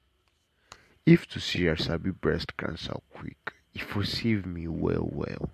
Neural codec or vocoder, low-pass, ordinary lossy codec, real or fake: none; 14.4 kHz; MP3, 64 kbps; real